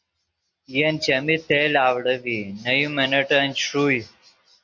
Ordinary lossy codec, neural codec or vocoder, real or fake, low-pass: Opus, 64 kbps; none; real; 7.2 kHz